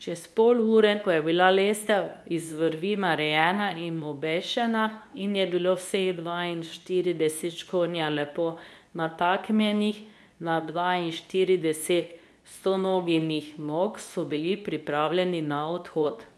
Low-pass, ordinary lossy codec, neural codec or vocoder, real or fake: none; none; codec, 24 kHz, 0.9 kbps, WavTokenizer, medium speech release version 2; fake